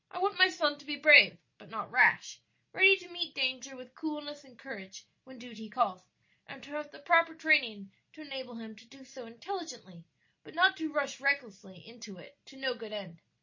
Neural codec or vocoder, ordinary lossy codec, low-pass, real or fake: none; MP3, 32 kbps; 7.2 kHz; real